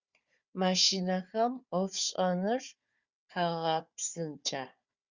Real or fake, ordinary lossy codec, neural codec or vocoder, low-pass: fake; Opus, 64 kbps; codec, 16 kHz, 4 kbps, FunCodec, trained on Chinese and English, 50 frames a second; 7.2 kHz